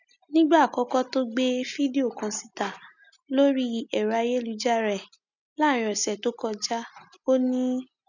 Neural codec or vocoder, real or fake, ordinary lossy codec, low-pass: none; real; none; 7.2 kHz